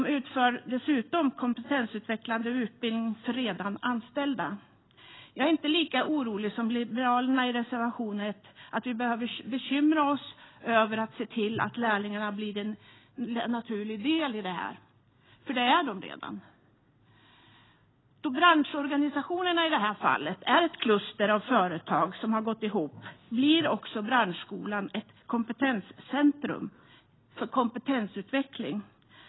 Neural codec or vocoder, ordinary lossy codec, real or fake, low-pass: none; AAC, 16 kbps; real; 7.2 kHz